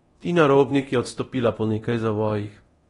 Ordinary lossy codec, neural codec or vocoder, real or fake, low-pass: AAC, 32 kbps; codec, 24 kHz, 0.9 kbps, DualCodec; fake; 10.8 kHz